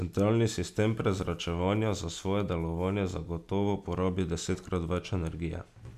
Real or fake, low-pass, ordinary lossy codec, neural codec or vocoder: real; 14.4 kHz; none; none